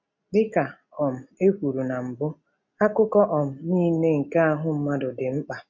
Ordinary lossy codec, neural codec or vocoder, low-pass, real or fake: MP3, 48 kbps; none; 7.2 kHz; real